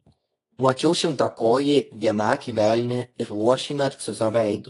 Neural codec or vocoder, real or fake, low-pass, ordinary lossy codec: codec, 24 kHz, 0.9 kbps, WavTokenizer, medium music audio release; fake; 10.8 kHz; AAC, 48 kbps